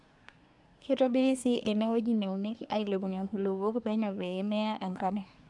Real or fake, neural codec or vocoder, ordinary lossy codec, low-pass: fake; codec, 24 kHz, 1 kbps, SNAC; none; 10.8 kHz